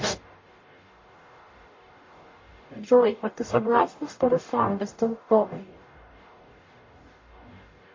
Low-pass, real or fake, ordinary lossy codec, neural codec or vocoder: 7.2 kHz; fake; MP3, 32 kbps; codec, 44.1 kHz, 0.9 kbps, DAC